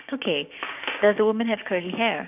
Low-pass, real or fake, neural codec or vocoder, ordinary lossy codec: 3.6 kHz; fake; codec, 16 kHz, 6 kbps, DAC; none